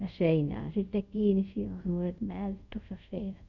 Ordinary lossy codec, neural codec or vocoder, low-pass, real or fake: none; codec, 24 kHz, 0.5 kbps, DualCodec; 7.2 kHz; fake